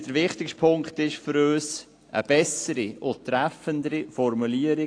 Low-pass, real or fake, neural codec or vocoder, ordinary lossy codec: 9.9 kHz; real; none; AAC, 48 kbps